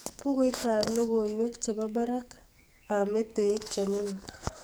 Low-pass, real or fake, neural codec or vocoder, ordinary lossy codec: none; fake; codec, 44.1 kHz, 2.6 kbps, SNAC; none